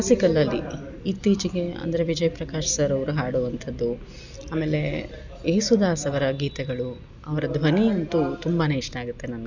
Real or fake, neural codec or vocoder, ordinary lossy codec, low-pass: real; none; none; 7.2 kHz